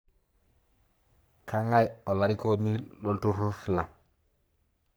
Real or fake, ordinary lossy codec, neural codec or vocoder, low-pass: fake; none; codec, 44.1 kHz, 3.4 kbps, Pupu-Codec; none